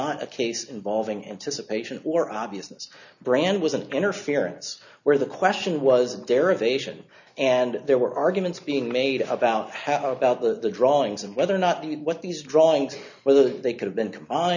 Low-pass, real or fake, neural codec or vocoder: 7.2 kHz; real; none